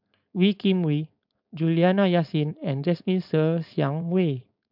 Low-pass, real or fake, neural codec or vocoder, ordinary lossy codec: 5.4 kHz; fake; codec, 16 kHz, 4.8 kbps, FACodec; MP3, 48 kbps